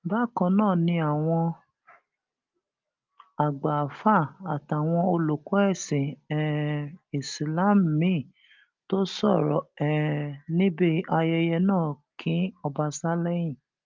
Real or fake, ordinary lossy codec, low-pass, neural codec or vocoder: real; Opus, 24 kbps; 7.2 kHz; none